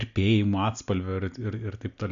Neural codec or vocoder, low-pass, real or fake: none; 7.2 kHz; real